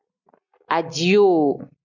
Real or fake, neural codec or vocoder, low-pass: real; none; 7.2 kHz